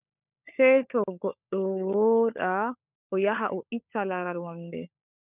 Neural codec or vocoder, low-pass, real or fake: codec, 16 kHz, 16 kbps, FunCodec, trained on LibriTTS, 50 frames a second; 3.6 kHz; fake